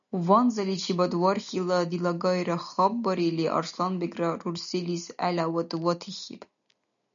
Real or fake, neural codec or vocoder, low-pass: real; none; 7.2 kHz